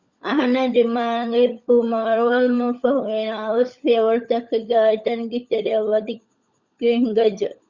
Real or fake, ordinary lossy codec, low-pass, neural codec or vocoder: fake; Opus, 64 kbps; 7.2 kHz; codec, 16 kHz, 16 kbps, FunCodec, trained on LibriTTS, 50 frames a second